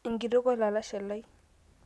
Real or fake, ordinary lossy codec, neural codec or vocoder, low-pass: real; none; none; none